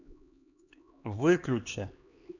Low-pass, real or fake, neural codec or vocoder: 7.2 kHz; fake; codec, 16 kHz, 2 kbps, X-Codec, HuBERT features, trained on LibriSpeech